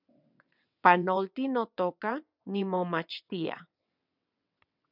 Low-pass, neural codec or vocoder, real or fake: 5.4 kHz; vocoder, 22.05 kHz, 80 mel bands, WaveNeXt; fake